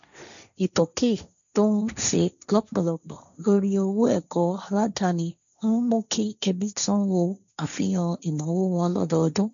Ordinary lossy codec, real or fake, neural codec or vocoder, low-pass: none; fake; codec, 16 kHz, 1.1 kbps, Voila-Tokenizer; 7.2 kHz